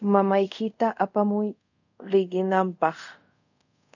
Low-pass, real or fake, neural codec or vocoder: 7.2 kHz; fake; codec, 24 kHz, 0.5 kbps, DualCodec